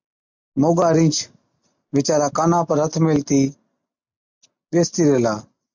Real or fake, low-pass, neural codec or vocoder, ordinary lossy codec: real; 7.2 kHz; none; MP3, 64 kbps